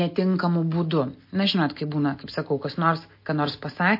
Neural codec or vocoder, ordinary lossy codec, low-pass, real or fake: none; MP3, 32 kbps; 5.4 kHz; real